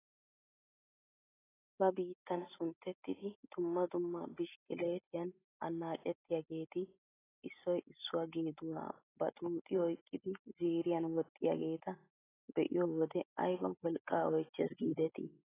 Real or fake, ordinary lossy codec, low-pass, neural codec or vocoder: real; AAC, 16 kbps; 3.6 kHz; none